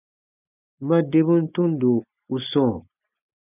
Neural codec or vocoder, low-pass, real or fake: none; 3.6 kHz; real